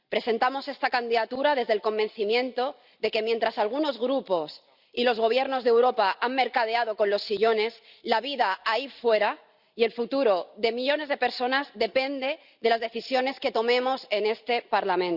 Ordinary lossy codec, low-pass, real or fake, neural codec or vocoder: Opus, 64 kbps; 5.4 kHz; real; none